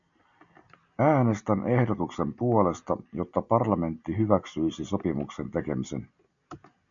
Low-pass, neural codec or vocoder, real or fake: 7.2 kHz; none; real